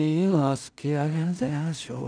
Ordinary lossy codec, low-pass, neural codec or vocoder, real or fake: none; 9.9 kHz; codec, 16 kHz in and 24 kHz out, 0.4 kbps, LongCat-Audio-Codec, two codebook decoder; fake